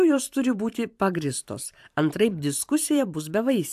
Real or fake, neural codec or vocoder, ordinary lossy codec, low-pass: fake; vocoder, 44.1 kHz, 128 mel bands every 512 samples, BigVGAN v2; AAC, 96 kbps; 14.4 kHz